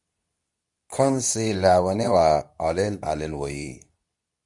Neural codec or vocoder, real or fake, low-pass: codec, 24 kHz, 0.9 kbps, WavTokenizer, medium speech release version 2; fake; 10.8 kHz